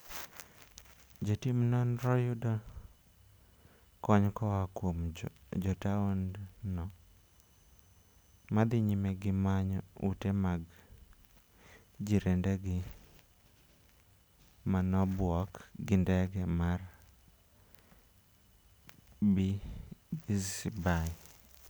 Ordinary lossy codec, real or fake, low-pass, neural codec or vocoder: none; real; none; none